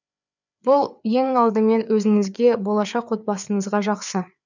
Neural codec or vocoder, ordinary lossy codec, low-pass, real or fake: codec, 16 kHz, 4 kbps, FreqCodec, larger model; none; 7.2 kHz; fake